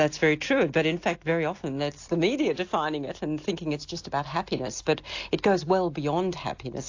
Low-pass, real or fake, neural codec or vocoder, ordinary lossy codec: 7.2 kHz; real; none; AAC, 48 kbps